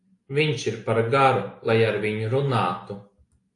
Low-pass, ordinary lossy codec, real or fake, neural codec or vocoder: 10.8 kHz; AAC, 48 kbps; real; none